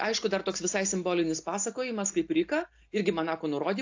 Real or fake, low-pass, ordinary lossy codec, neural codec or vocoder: real; 7.2 kHz; AAC, 48 kbps; none